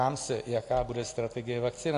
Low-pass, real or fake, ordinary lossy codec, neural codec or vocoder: 10.8 kHz; real; AAC, 48 kbps; none